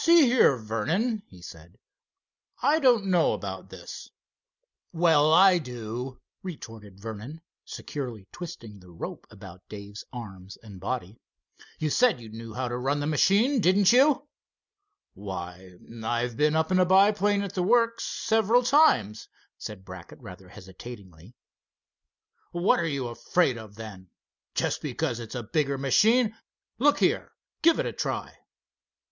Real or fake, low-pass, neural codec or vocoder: real; 7.2 kHz; none